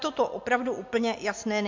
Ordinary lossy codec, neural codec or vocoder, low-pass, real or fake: MP3, 48 kbps; none; 7.2 kHz; real